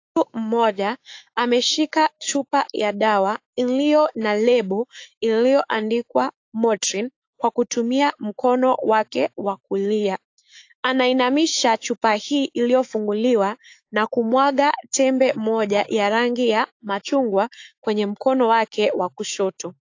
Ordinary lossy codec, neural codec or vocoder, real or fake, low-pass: AAC, 48 kbps; autoencoder, 48 kHz, 128 numbers a frame, DAC-VAE, trained on Japanese speech; fake; 7.2 kHz